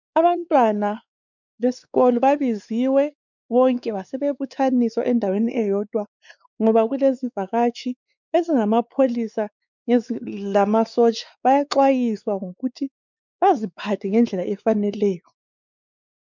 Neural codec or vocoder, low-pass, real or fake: codec, 16 kHz, 4 kbps, X-Codec, WavLM features, trained on Multilingual LibriSpeech; 7.2 kHz; fake